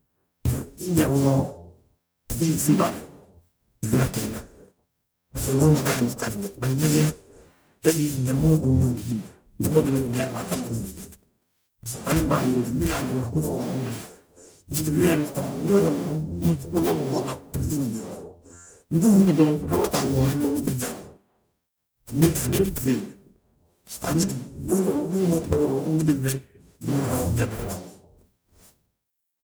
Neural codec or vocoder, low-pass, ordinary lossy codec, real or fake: codec, 44.1 kHz, 0.9 kbps, DAC; none; none; fake